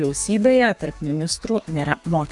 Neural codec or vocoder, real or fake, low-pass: codec, 32 kHz, 1.9 kbps, SNAC; fake; 10.8 kHz